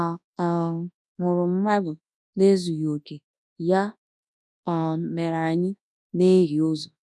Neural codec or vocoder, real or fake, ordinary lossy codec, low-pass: codec, 24 kHz, 0.9 kbps, WavTokenizer, large speech release; fake; none; none